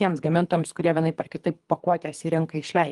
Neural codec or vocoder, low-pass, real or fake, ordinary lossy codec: codec, 24 kHz, 3 kbps, HILCodec; 10.8 kHz; fake; Opus, 32 kbps